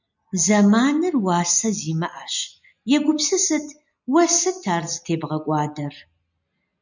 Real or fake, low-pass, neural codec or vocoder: real; 7.2 kHz; none